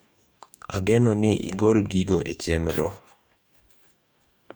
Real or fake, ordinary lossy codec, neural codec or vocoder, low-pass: fake; none; codec, 44.1 kHz, 2.6 kbps, DAC; none